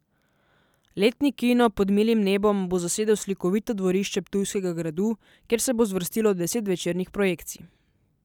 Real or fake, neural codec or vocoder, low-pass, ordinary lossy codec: real; none; 19.8 kHz; none